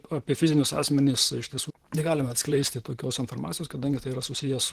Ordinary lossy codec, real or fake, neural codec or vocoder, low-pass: Opus, 16 kbps; fake; vocoder, 44.1 kHz, 128 mel bands, Pupu-Vocoder; 14.4 kHz